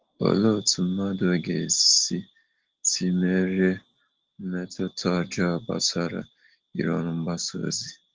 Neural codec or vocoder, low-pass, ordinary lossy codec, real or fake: none; 7.2 kHz; Opus, 16 kbps; real